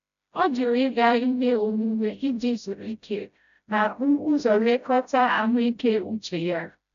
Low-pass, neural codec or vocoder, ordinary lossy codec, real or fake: 7.2 kHz; codec, 16 kHz, 0.5 kbps, FreqCodec, smaller model; none; fake